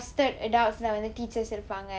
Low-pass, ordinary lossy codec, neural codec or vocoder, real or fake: none; none; none; real